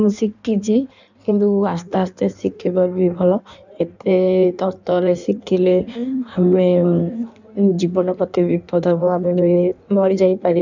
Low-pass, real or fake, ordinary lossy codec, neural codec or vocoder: 7.2 kHz; fake; none; codec, 16 kHz in and 24 kHz out, 1.1 kbps, FireRedTTS-2 codec